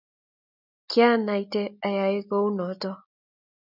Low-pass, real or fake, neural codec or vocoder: 5.4 kHz; real; none